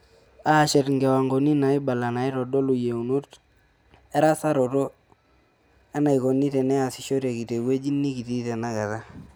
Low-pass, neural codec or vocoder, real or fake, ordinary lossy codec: none; none; real; none